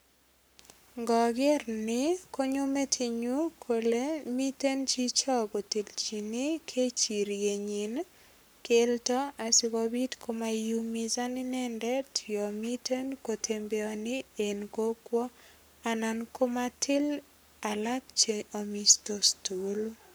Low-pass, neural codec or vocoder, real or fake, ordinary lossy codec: none; codec, 44.1 kHz, 7.8 kbps, Pupu-Codec; fake; none